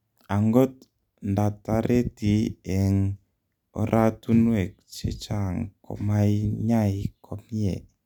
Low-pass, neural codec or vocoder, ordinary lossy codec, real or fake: 19.8 kHz; none; none; real